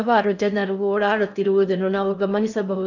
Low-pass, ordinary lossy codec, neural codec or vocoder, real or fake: 7.2 kHz; none; codec, 16 kHz in and 24 kHz out, 0.6 kbps, FocalCodec, streaming, 4096 codes; fake